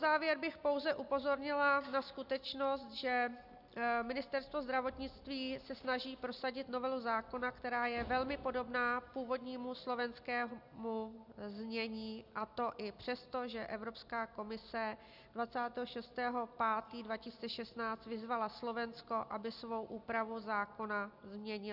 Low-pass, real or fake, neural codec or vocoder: 5.4 kHz; real; none